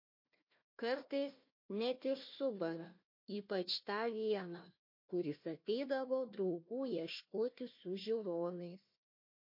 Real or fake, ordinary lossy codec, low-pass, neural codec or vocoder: fake; MP3, 32 kbps; 5.4 kHz; codec, 16 kHz, 1 kbps, FunCodec, trained on Chinese and English, 50 frames a second